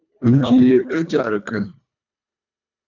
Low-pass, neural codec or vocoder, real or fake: 7.2 kHz; codec, 24 kHz, 1.5 kbps, HILCodec; fake